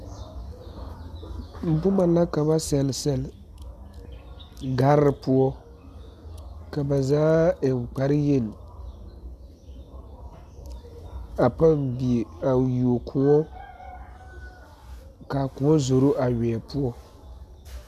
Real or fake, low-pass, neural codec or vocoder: real; 14.4 kHz; none